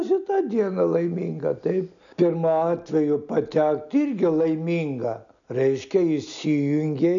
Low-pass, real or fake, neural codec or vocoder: 7.2 kHz; real; none